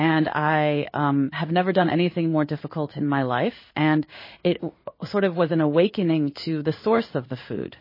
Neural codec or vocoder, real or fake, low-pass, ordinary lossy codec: codec, 16 kHz in and 24 kHz out, 1 kbps, XY-Tokenizer; fake; 5.4 kHz; MP3, 24 kbps